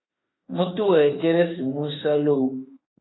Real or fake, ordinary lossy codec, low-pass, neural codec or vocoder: fake; AAC, 16 kbps; 7.2 kHz; autoencoder, 48 kHz, 32 numbers a frame, DAC-VAE, trained on Japanese speech